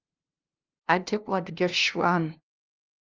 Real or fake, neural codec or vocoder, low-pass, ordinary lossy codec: fake; codec, 16 kHz, 0.5 kbps, FunCodec, trained on LibriTTS, 25 frames a second; 7.2 kHz; Opus, 32 kbps